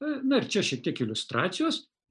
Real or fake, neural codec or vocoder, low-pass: real; none; 9.9 kHz